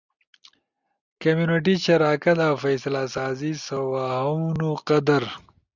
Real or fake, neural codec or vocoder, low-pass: real; none; 7.2 kHz